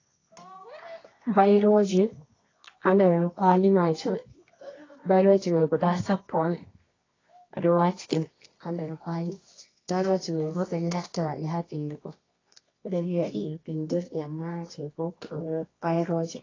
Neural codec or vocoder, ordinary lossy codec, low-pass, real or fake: codec, 24 kHz, 0.9 kbps, WavTokenizer, medium music audio release; AAC, 32 kbps; 7.2 kHz; fake